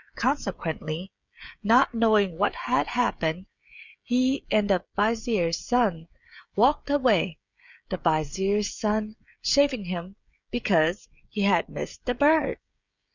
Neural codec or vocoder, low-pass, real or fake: codec, 16 kHz, 16 kbps, FreqCodec, smaller model; 7.2 kHz; fake